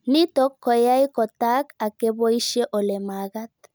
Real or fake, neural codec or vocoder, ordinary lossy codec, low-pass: real; none; none; none